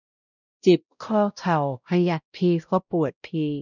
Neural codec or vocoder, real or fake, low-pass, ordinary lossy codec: codec, 16 kHz, 0.5 kbps, X-Codec, WavLM features, trained on Multilingual LibriSpeech; fake; 7.2 kHz; none